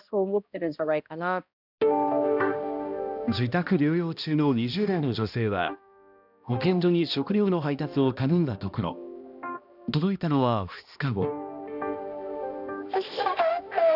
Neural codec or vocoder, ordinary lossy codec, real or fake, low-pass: codec, 16 kHz, 1 kbps, X-Codec, HuBERT features, trained on balanced general audio; none; fake; 5.4 kHz